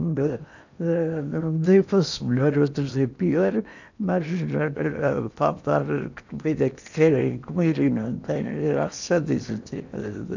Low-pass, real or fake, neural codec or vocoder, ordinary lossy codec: 7.2 kHz; fake; codec, 16 kHz in and 24 kHz out, 0.8 kbps, FocalCodec, streaming, 65536 codes; none